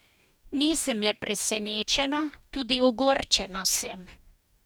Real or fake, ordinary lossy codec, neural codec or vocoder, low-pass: fake; none; codec, 44.1 kHz, 2.6 kbps, DAC; none